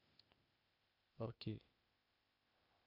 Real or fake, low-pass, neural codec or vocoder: fake; 5.4 kHz; codec, 16 kHz, 0.8 kbps, ZipCodec